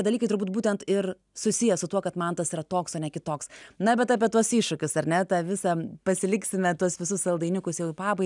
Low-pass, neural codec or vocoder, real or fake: 10.8 kHz; none; real